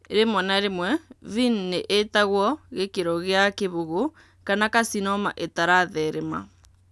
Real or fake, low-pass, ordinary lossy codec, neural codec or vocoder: real; none; none; none